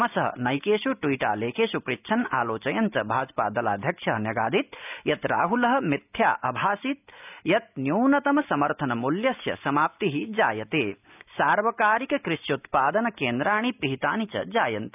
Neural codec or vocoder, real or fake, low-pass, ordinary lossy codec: none; real; 3.6 kHz; none